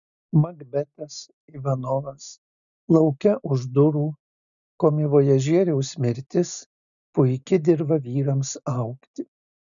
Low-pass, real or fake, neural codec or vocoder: 7.2 kHz; real; none